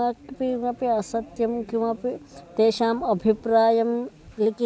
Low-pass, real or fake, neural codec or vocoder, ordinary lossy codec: none; real; none; none